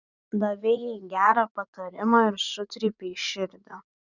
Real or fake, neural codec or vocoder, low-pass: fake; vocoder, 44.1 kHz, 80 mel bands, Vocos; 7.2 kHz